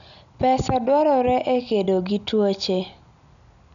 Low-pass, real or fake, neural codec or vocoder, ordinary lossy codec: 7.2 kHz; real; none; none